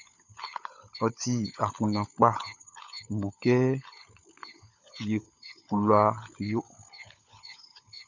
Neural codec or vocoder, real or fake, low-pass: codec, 16 kHz, 16 kbps, FunCodec, trained on LibriTTS, 50 frames a second; fake; 7.2 kHz